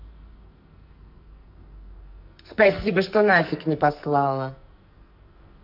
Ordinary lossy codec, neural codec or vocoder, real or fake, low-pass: none; codec, 44.1 kHz, 2.6 kbps, SNAC; fake; 5.4 kHz